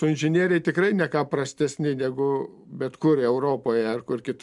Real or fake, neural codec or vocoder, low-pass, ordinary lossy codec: real; none; 10.8 kHz; MP3, 96 kbps